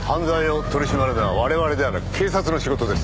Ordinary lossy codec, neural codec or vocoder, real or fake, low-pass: none; none; real; none